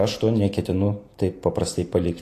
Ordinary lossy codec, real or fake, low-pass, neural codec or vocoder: AAC, 48 kbps; real; 14.4 kHz; none